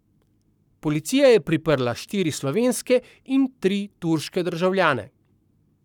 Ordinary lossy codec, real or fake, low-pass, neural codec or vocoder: none; fake; 19.8 kHz; codec, 44.1 kHz, 7.8 kbps, Pupu-Codec